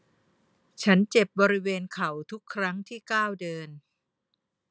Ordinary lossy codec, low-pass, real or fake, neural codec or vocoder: none; none; real; none